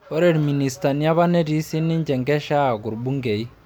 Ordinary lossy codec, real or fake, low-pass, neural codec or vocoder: none; real; none; none